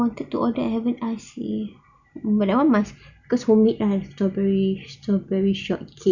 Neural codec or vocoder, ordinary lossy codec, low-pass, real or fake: none; none; 7.2 kHz; real